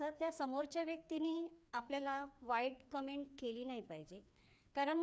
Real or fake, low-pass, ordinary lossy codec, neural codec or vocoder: fake; none; none; codec, 16 kHz, 2 kbps, FreqCodec, larger model